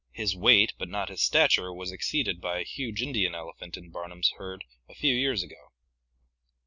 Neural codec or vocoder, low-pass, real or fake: none; 7.2 kHz; real